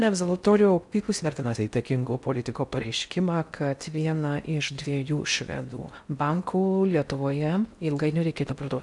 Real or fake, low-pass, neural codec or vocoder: fake; 10.8 kHz; codec, 16 kHz in and 24 kHz out, 0.6 kbps, FocalCodec, streaming, 4096 codes